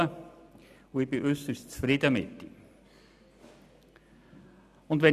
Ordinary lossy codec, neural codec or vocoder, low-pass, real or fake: none; none; 14.4 kHz; real